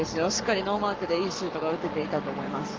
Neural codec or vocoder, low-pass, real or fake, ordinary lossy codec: codec, 44.1 kHz, 7.8 kbps, Pupu-Codec; 7.2 kHz; fake; Opus, 32 kbps